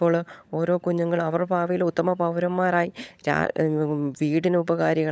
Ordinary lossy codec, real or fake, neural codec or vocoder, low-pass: none; fake; codec, 16 kHz, 16 kbps, FunCodec, trained on LibriTTS, 50 frames a second; none